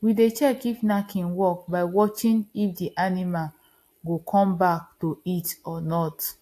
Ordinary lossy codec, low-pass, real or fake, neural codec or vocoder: AAC, 64 kbps; 14.4 kHz; real; none